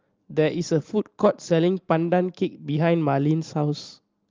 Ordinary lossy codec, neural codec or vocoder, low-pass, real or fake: Opus, 32 kbps; none; 7.2 kHz; real